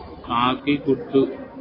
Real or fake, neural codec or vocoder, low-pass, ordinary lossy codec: real; none; 5.4 kHz; AAC, 24 kbps